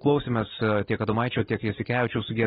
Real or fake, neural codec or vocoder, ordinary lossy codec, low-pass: real; none; AAC, 16 kbps; 7.2 kHz